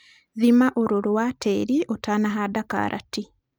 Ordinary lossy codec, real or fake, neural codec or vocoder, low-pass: none; real; none; none